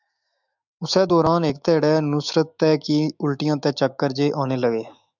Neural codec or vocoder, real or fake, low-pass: autoencoder, 48 kHz, 128 numbers a frame, DAC-VAE, trained on Japanese speech; fake; 7.2 kHz